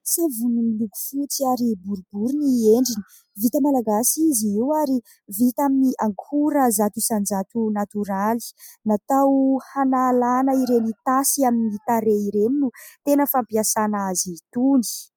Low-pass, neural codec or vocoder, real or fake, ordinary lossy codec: 19.8 kHz; none; real; MP3, 96 kbps